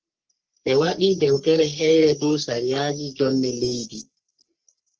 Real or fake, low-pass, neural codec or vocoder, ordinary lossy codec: fake; 7.2 kHz; codec, 44.1 kHz, 3.4 kbps, Pupu-Codec; Opus, 16 kbps